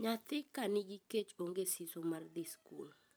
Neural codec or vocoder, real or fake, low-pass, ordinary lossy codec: vocoder, 44.1 kHz, 128 mel bands every 512 samples, BigVGAN v2; fake; none; none